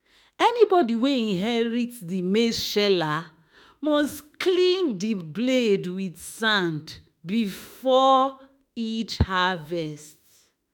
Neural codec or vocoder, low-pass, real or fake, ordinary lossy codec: autoencoder, 48 kHz, 32 numbers a frame, DAC-VAE, trained on Japanese speech; 19.8 kHz; fake; none